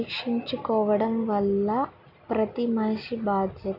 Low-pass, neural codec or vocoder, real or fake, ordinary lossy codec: 5.4 kHz; none; real; none